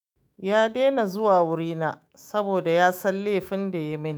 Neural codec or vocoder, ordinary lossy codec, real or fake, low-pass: autoencoder, 48 kHz, 128 numbers a frame, DAC-VAE, trained on Japanese speech; none; fake; none